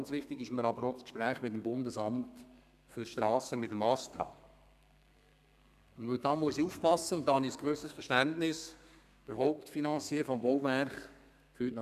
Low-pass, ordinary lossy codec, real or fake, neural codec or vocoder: 14.4 kHz; none; fake; codec, 32 kHz, 1.9 kbps, SNAC